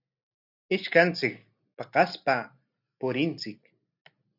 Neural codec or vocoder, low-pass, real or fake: none; 5.4 kHz; real